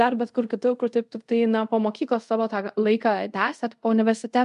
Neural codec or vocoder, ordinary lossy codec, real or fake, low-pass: codec, 24 kHz, 0.5 kbps, DualCodec; MP3, 64 kbps; fake; 10.8 kHz